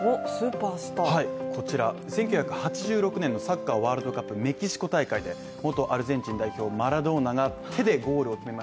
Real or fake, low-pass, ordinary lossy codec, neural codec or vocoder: real; none; none; none